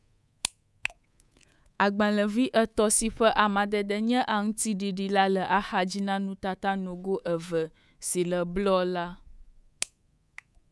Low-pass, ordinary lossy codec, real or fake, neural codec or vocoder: none; none; fake; codec, 24 kHz, 3.1 kbps, DualCodec